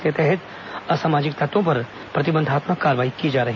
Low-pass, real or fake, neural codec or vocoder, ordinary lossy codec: 7.2 kHz; real; none; none